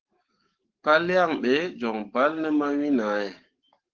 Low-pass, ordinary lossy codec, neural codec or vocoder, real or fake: 7.2 kHz; Opus, 16 kbps; codec, 44.1 kHz, 7.8 kbps, DAC; fake